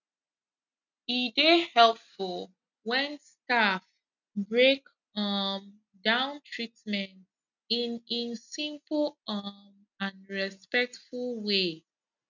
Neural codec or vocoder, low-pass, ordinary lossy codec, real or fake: none; 7.2 kHz; none; real